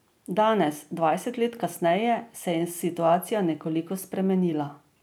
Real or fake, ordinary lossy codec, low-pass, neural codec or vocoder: real; none; none; none